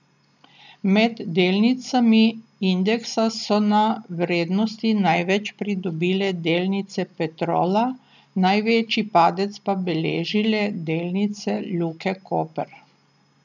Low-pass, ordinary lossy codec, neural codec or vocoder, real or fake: 7.2 kHz; none; none; real